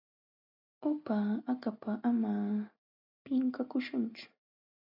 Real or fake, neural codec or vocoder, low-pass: real; none; 5.4 kHz